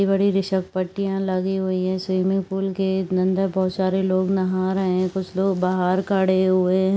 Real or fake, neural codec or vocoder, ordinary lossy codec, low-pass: real; none; none; none